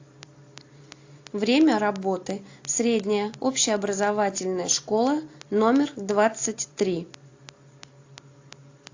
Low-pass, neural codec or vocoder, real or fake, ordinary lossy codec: 7.2 kHz; none; real; AAC, 48 kbps